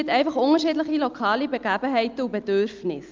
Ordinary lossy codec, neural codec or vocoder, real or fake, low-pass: Opus, 24 kbps; none; real; 7.2 kHz